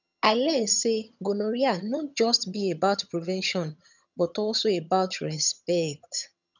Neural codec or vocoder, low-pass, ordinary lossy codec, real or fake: vocoder, 22.05 kHz, 80 mel bands, HiFi-GAN; 7.2 kHz; none; fake